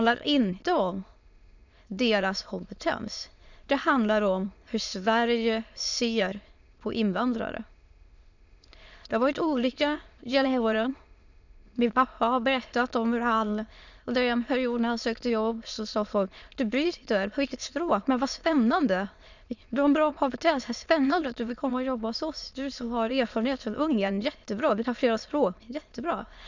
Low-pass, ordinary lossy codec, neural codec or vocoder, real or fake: 7.2 kHz; none; autoencoder, 22.05 kHz, a latent of 192 numbers a frame, VITS, trained on many speakers; fake